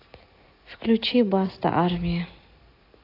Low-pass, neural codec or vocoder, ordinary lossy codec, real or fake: 5.4 kHz; none; AAC, 32 kbps; real